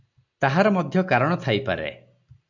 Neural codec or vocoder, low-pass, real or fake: none; 7.2 kHz; real